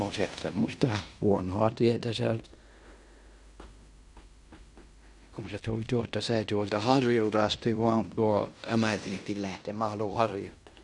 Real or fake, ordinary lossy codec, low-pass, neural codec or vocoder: fake; none; 10.8 kHz; codec, 16 kHz in and 24 kHz out, 0.9 kbps, LongCat-Audio-Codec, fine tuned four codebook decoder